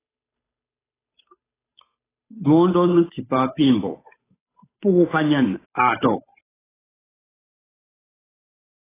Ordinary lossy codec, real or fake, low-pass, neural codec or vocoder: AAC, 16 kbps; fake; 3.6 kHz; codec, 16 kHz, 8 kbps, FunCodec, trained on Chinese and English, 25 frames a second